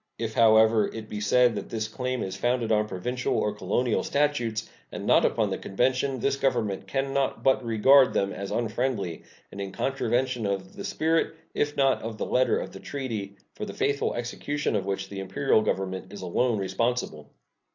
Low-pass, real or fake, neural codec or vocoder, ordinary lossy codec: 7.2 kHz; real; none; AAC, 48 kbps